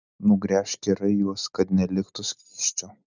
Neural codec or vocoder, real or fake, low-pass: none; real; 7.2 kHz